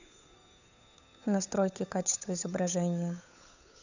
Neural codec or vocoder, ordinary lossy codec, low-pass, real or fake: codec, 16 kHz, 8 kbps, FunCodec, trained on Chinese and English, 25 frames a second; none; 7.2 kHz; fake